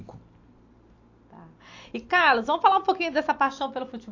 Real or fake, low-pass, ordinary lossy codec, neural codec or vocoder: real; 7.2 kHz; AAC, 48 kbps; none